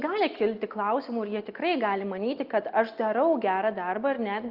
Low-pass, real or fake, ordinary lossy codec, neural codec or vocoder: 5.4 kHz; real; Opus, 24 kbps; none